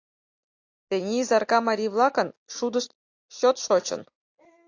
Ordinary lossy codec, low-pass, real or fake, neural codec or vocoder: AAC, 48 kbps; 7.2 kHz; real; none